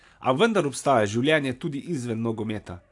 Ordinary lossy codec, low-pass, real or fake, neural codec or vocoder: AAC, 64 kbps; 10.8 kHz; real; none